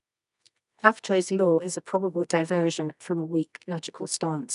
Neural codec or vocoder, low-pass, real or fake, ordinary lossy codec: codec, 24 kHz, 0.9 kbps, WavTokenizer, medium music audio release; 10.8 kHz; fake; none